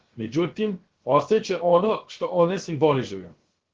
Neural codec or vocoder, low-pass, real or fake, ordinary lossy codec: codec, 16 kHz, about 1 kbps, DyCAST, with the encoder's durations; 7.2 kHz; fake; Opus, 16 kbps